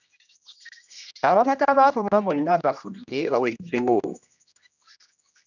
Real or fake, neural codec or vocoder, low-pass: fake; codec, 16 kHz, 1 kbps, X-Codec, HuBERT features, trained on general audio; 7.2 kHz